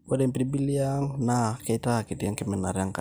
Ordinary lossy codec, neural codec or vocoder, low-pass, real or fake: none; none; none; real